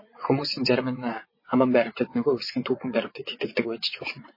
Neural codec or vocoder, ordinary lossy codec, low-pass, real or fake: vocoder, 22.05 kHz, 80 mel bands, WaveNeXt; MP3, 24 kbps; 5.4 kHz; fake